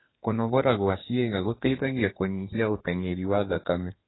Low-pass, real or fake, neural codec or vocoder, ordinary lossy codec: 7.2 kHz; fake; codec, 32 kHz, 1.9 kbps, SNAC; AAC, 16 kbps